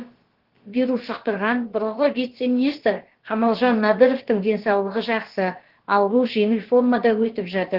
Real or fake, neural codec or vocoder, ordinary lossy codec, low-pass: fake; codec, 16 kHz, about 1 kbps, DyCAST, with the encoder's durations; Opus, 16 kbps; 5.4 kHz